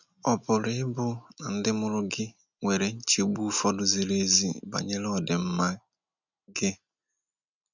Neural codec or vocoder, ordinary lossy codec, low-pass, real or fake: none; none; 7.2 kHz; real